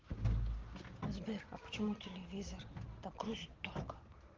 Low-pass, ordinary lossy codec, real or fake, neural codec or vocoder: 7.2 kHz; Opus, 16 kbps; real; none